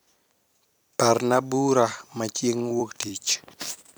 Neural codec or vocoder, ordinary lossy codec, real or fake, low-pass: vocoder, 44.1 kHz, 128 mel bands every 256 samples, BigVGAN v2; none; fake; none